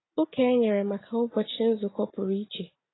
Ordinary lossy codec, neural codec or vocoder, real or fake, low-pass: AAC, 16 kbps; none; real; 7.2 kHz